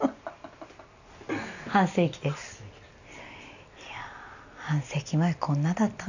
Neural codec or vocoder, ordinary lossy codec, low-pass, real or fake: none; none; 7.2 kHz; real